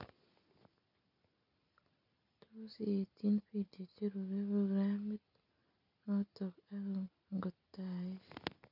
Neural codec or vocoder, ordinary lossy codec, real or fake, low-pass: none; none; real; 5.4 kHz